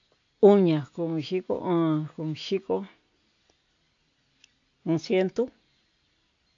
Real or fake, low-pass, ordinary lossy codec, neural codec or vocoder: real; 7.2 kHz; AAC, 48 kbps; none